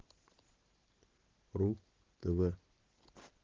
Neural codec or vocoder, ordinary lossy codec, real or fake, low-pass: none; Opus, 16 kbps; real; 7.2 kHz